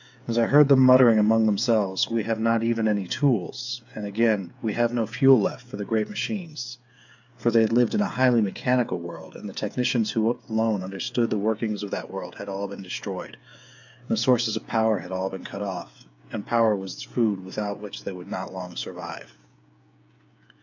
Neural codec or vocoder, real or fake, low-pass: codec, 16 kHz, 8 kbps, FreqCodec, smaller model; fake; 7.2 kHz